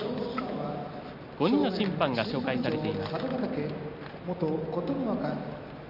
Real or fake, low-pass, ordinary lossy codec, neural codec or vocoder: real; 5.4 kHz; none; none